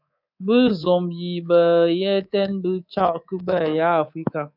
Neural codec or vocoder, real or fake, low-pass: codec, 24 kHz, 3.1 kbps, DualCodec; fake; 5.4 kHz